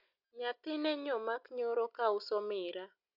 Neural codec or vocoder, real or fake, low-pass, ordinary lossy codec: none; real; 5.4 kHz; none